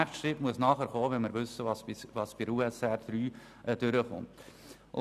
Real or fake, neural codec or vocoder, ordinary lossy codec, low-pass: real; none; none; 14.4 kHz